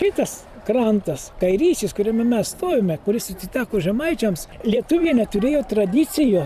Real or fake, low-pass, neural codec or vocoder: fake; 14.4 kHz; vocoder, 44.1 kHz, 128 mel bands every 512 samples, BigVGAN v2